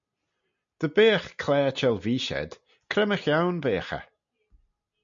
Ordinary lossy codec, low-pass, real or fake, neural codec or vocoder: MP3, 64 kbps; 7.2 kHz; real; none